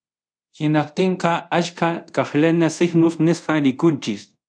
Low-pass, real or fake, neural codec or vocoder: 9.9 kHz; fake; codec, 24 kHz, 0.5 kbps, DualCodec